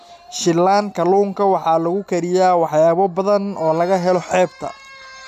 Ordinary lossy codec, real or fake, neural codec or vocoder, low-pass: none; real; none; 14.4 kHz